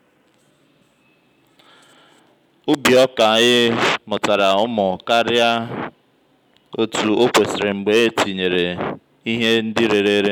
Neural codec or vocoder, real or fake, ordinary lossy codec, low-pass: none; real; none; 19.8 kHz